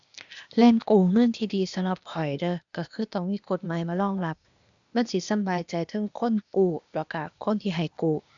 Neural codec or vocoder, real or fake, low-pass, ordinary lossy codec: codec, 16 kHz, 0.8 kbps, ZipCodec; fake; 7.2 kHz; none